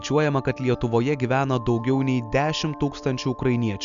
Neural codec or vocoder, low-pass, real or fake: none; 7.2 kHz; real